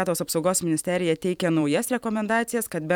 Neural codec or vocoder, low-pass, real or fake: vocoder, 44.1 kHz, 128 mel bands every 512 samples, BigVGAN v2; 19.8 kHz; fake